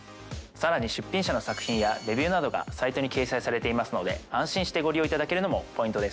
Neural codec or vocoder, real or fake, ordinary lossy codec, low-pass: none; real; none; none